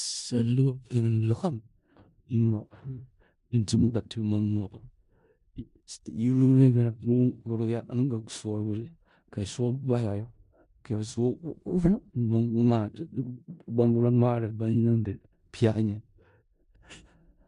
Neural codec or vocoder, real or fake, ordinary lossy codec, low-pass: codec, 16 kHz in and 24 kHz out, 0.4 kbps, LongCat-Audio-Codec, four codebook decoder; fake; MP3, 64 kbps; 10.8 kHz